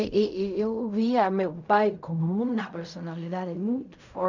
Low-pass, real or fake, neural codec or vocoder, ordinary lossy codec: 7.2 kHz; fake; codec, 16 kHz in and 24 kHz out, 0.4 kbps, LongCat-Audio-Codec, fine tuned four codebook decoder; none